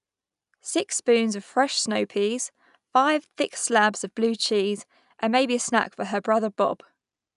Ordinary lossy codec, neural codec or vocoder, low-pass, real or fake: none; none; 10.8 kHz; real